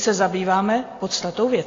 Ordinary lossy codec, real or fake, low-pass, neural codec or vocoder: AAC, 32 kbps; real; 7.2 kHz; none